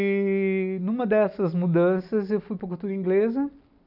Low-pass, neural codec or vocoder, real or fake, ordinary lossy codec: 5.4 kHz; none; real; none